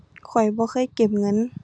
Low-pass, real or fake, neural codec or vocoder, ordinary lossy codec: none; real; none; none